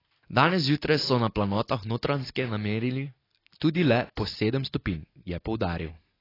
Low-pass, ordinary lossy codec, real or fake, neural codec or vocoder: 5.4 kHz; AAC, 24 kbps; real; none